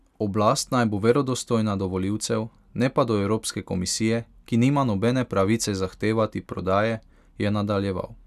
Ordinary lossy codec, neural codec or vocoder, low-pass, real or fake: none; none; 14.4 kHz; real